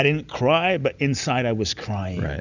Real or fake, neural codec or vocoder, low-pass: real; none; 7.2 kHz